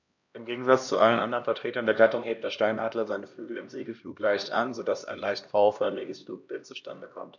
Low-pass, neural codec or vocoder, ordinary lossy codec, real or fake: 7.2 kHz; codec, 16 kHz, 1 kbps, X-Codec, HuBERT features, trained on LibriSpeech; none; fake